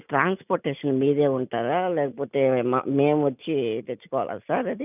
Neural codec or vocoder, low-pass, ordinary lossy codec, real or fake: none; 3.6 kHz; none; real